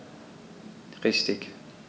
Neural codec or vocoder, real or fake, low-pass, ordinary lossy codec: none; real; none; none